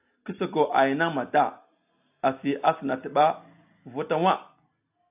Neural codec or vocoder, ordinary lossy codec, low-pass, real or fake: none; AAC, 32 kbps; 3.6 kHz; real